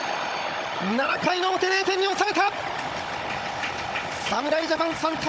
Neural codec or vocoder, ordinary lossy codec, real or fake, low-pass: codec, 16 kHz, 16 kbps, FunCodec, trained on Chinese and English, 50 frames a second; none; fake; none